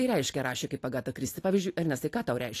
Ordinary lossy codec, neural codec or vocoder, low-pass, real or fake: AAC, 48 kbps; none; 14.4 kHz; real